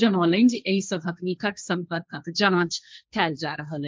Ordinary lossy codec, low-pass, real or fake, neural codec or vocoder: none; none; fake; codec, 16 kHz, 1.1 kbps, Voila-Tokenizer